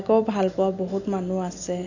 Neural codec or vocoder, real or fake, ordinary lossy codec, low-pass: none; real; none; 7.2 kHz